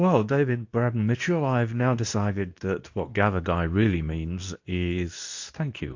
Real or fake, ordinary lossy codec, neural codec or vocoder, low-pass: fake; AAC, 48 kbps; codec, 24 kHz, 0.9 kbps, WavTokenizer, medium speech release version 2; 7.2 kHz